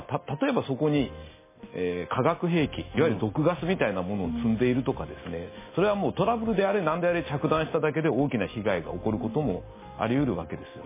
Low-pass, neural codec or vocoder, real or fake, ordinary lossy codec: 3.6 kHz; none; real; MP3, 16 kbps